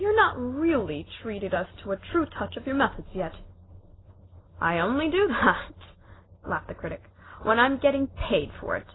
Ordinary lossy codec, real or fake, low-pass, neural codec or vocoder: AAC, 16 kbps; real; 7.2 kHz; none